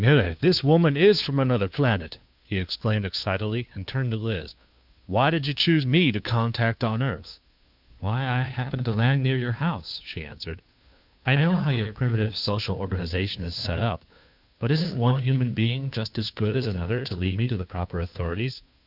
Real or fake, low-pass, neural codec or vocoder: fake; 5.4 kHz; codec, 16 kHz, 2 kbps, FunCodec, trained on Chinese and English, 25 frames a second